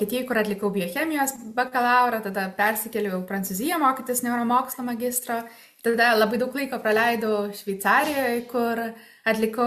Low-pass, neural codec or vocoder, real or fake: 14.4 kHz; none; real